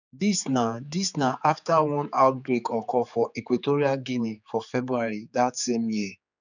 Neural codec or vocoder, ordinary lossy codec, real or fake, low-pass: codec, 16 kHz, 4 kbps, X-Codec, HuBERT features, trained on general audio; none; fake; 7.2 kHz